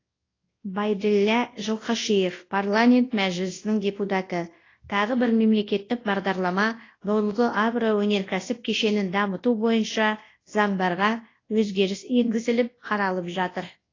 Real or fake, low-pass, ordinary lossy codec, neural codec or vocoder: fake; 7.2 kHz; AAC, 32 kbps; codec, 24 kHz, 0.9 kbps, WavTokenizer, large speech release